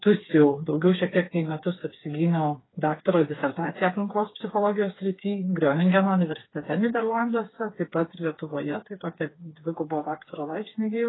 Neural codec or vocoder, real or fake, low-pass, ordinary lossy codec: codec, 16 kHz, 4 kbps, FreqCodec, smaller model; fake; 7.2 kHz; AAC, 16 kbps